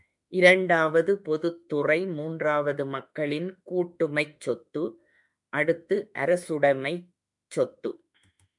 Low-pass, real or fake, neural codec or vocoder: 10.8 kHz; fake; autoencoder, 48 kHz, 32 numbers a frame, DAC-VAE, trained on Japanese speech